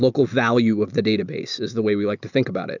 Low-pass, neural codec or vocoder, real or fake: 7.2 kHz; none; real